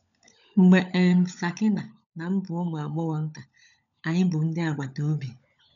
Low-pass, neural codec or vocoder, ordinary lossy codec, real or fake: 7.2 kHz; codec, 16 kHz, 16 kbps, FunCodec, trained on LibriTTS, 50 frames a second; none; fake